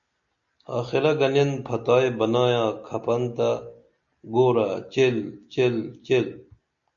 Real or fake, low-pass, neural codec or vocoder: real; 7.2 kHz; none